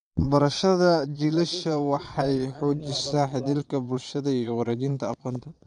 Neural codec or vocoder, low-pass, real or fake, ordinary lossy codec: vocoder, 22.05 kHz, 80 mel bands, Vocos; 9.9 kHz; fake; none